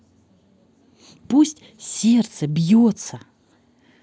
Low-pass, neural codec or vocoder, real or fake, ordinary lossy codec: none; none; real; none